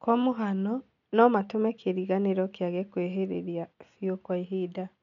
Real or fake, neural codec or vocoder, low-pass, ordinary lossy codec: real; none; 7.2 kHz; none